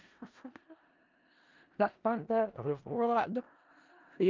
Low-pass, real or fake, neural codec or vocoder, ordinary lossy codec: 7.2 kHz; fake; codec, 16 kHz in and 24 kHz out, 0.4 kbps, LongCat-Audio-Codec, four codebook decoder; Opus, 32 kbps